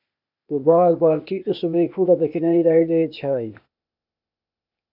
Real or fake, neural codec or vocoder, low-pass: fake; codec, 16 kHz, 0.8 kbps, ZipCodec; 5.4 kHz